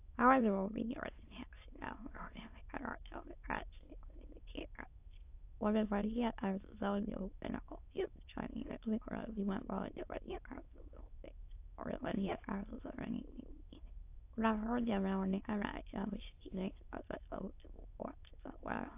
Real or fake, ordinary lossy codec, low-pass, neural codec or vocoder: fake; AAC, 32 kbps; 3.6 kHz; autoencoder, 22.05 kHz, a latent of 192 numbers a frame, VITS, trained on many speakers